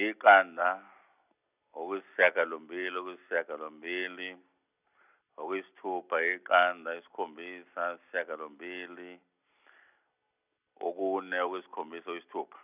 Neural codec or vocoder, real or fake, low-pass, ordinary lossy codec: none; real; 3.6 kHz; none